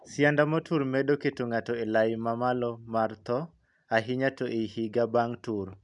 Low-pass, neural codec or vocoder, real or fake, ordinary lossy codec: 10.8 kHz; none; real; none